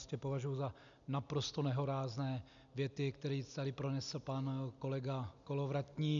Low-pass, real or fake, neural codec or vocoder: 7.2 kHz; real; none